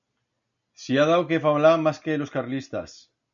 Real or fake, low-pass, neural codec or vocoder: real; 7.2 kHz; none